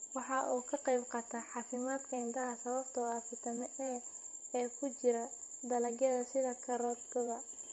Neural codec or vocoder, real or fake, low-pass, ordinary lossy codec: vocoder, 44.1 kHz, 128 mel bands every 512 samples, BigVGAN v2; fake; 19.8 kHz; MP3, 48 kbps